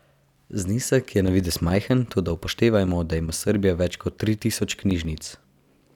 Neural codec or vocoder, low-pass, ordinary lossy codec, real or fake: none; 19.8 kHz; none; real